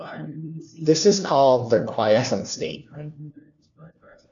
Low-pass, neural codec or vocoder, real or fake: 7.2 kHz; codec, 16 kHz, 1 kbps, FunCodec, trained on LibriTTS, 50 frames a second; fake